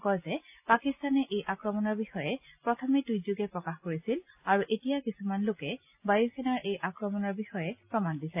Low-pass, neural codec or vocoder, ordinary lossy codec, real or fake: 3.6 kHz; none; AAC, 32 kbps; real